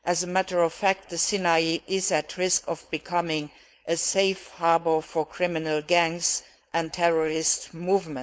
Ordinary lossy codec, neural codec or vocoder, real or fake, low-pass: none; codec, 16 kHz, 4.8 kbps, FACodec; fake; none